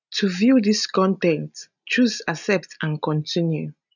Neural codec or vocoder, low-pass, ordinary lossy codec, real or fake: none; 7.2 kHz; none; real